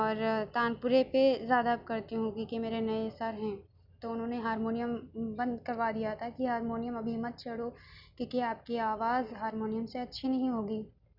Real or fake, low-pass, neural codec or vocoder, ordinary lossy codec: real; 5.4 kHz; none; none